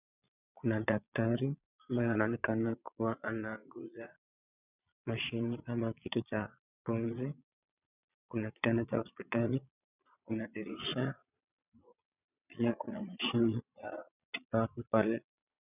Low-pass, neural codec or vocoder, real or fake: 3.6 kHz; vocoder, 22.05 kHz, 80 mel bands, Vocos; fake